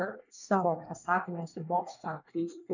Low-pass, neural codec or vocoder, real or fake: 7.2 kHz; codec, 24 kHz, 1 kbps, SNAC; fake